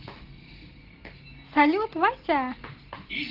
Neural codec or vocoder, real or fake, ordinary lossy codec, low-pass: none; real; Opus, 16 kbps; 5.4 kHz